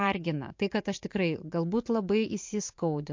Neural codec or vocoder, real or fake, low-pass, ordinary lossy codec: vocoder, 44.1 kHz, 80 mel bands, Vocos; fake; 7.2 kHz; MP3, 48 kbps